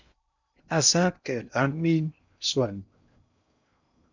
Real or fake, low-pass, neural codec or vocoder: fake; 7.2 kHz; codec, 16 kHz in and 24 kHz out, 0.6 kbps, FocalCodec, streaming, 2048 codes